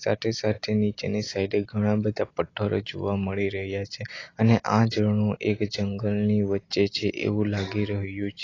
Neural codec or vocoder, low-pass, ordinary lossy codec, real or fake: none; 7.2 kHz; AAC, 32 kbps; real